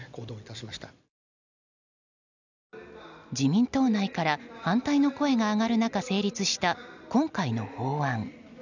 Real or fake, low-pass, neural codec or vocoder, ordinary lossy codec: real; 7.2 kHz; none; none